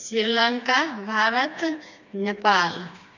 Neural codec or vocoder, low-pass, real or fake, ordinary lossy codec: codec, 16 kHz, 2 kbps, FreqCodec, smaller model; 7.2 kHz; fake; none